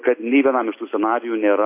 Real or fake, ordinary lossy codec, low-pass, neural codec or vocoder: real; MP3, 24 kbps; 3.6 kHz; none